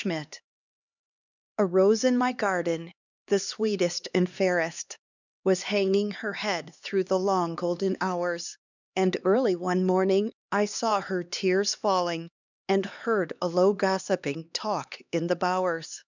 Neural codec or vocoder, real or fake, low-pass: codec, 16 kHz, 2 kbps, X-Codec, HuBERT features, trained on LibriSpeech; fake; 7.2 kHz